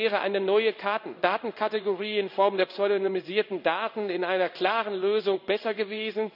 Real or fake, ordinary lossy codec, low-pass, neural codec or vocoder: fake; none; 5.4 kHz; codec, 16 kHz in and 24 kHz out, 1 kbps, XY-Tokenizer